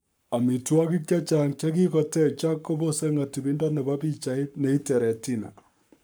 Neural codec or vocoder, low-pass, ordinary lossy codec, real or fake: codec, 44.1 kHz, 7.8 kbps, Pupu-Codec; none; none; fake